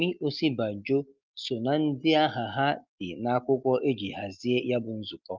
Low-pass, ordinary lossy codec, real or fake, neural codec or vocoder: 7.2 kHz; Opus, 24 kbps; real; none